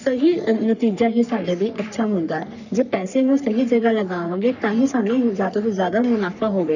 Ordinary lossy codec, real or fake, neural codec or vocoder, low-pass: none; fake; codec, 44.1 kHz, 3.4 kbps, Pupu-Codec; 7.2 kHz